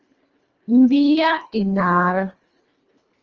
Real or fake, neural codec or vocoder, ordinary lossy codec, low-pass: fake; codec, 24 kHz, 1.5 kbps, HILCodec; Opus, 24 kbps; 7.2 kHz